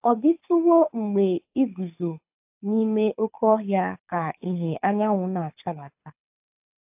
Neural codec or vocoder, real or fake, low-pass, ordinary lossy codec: codec, 24 kHz, 6 kbps, HILCodec; fake; 3.6 kHz; AAC, 32 kbps